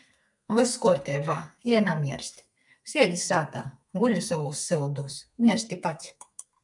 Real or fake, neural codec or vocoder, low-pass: fake; codec, 32 kHz, 1.9 kbps, SNAC; 10.8 kHz